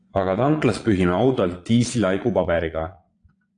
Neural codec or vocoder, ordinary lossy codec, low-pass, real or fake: vocoder, 22.05 kHz, 80 mel bands, Vocos; AAC, 48 kbps; 9.9 kHz; fake